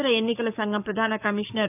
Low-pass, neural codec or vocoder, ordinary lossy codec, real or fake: 3.6 kHz; codec, 44.1 kHz, 7.8 kbps, Pupu-Codec; none; fake